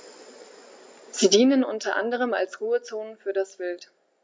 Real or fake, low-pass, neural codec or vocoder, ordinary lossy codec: real; none; none; none